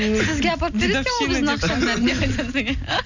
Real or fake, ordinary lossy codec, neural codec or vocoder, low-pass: real; none; none; 7.2 kHz